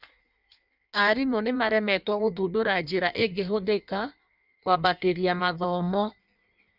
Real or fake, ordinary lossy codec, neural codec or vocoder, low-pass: fake; none; codec, 16 kHz in and 24 kHz out, 1.1 kbps, FireRedTTS-2 codec; 5.4 kHz